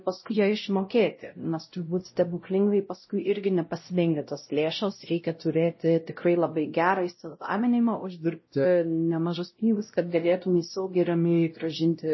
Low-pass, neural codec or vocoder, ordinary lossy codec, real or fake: 7.2 kHz; codec, 16 kHz, 0.5 kbps, X-Codec, WavLM features, trained on Multilingual LibriSpeech; MP3, 24 kbps; fake